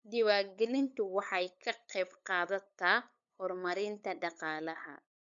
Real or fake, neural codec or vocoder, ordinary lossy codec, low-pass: fake; codec, 16 kHz, 8 kbps, FunCodec, trained on LibriTTS, 25 frames a second; none; 7.2 kHz